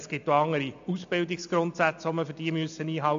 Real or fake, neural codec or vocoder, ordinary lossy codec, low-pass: real; none; AAC, 64 kbps; 7.2 kHz